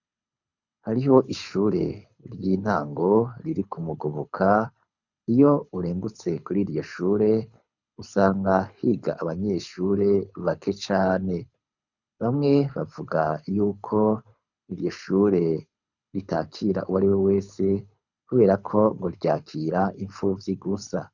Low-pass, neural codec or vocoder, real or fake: 7.2 kHz; codec, 24 kHz, 6 kbps, HILCodec; fake